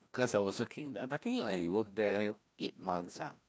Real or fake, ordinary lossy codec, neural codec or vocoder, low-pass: fake; none; codec, 16 kHz, 1 kbps, FreqCodec, larger model; none